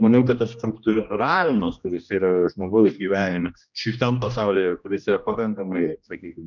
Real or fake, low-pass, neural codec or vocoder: fake; 7.2 kHz; codec, 16 kHz, 1 kbps, X-Codec, HuBERT features, trained on general audio